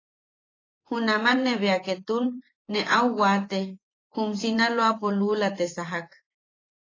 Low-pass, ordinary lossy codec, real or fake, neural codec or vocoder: 7.2 kHz; AAC, 32 kbps; fake; vocoder, 24 kHz, 100 mel bands, Vocos